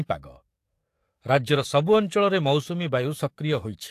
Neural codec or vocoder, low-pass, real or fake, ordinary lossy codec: codec, 44.1 kHz, 7.8 kbps, DAC; 14.4 kHz; fake; AAC, 64 kbps